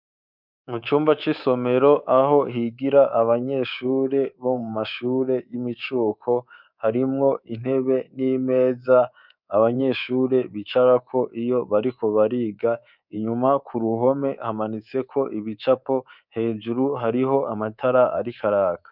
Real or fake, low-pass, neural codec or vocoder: fake; 5.4 kHz; codec, 24 kHz, 3.1 kbps, DualCodec